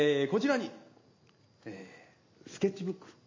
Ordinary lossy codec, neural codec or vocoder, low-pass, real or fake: MP3, 32 kbps; none; 7.2 kHz; real